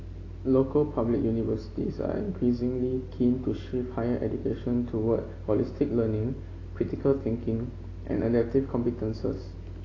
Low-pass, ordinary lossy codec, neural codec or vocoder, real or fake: 7.2 kHz; AAC, 32 kbps; none; real